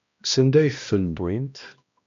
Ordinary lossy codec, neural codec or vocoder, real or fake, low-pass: MP3, 64 kbps; codec, 16 kHz, 1 kbps, X-Codec, HuBERT features, trained on balanced general audio; fake; 7.2 kHz